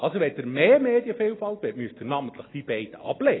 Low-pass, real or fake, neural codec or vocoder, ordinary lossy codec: 7.2 kHz; real; none; AAC, 16 kbps